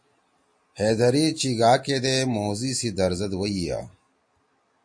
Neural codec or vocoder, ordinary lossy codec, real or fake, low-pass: none; MP3, 48 kbps; real; 9.9 kHz